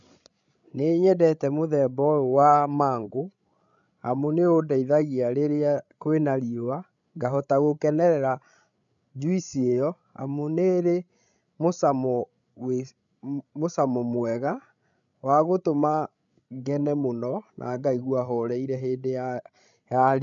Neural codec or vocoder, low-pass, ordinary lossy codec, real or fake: codec, 16 kHz, 16 kbps, FreqCodec, larger model; 7.2 kHz; none; fake